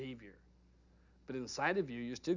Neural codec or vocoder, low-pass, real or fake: none; 7.2 kHz; real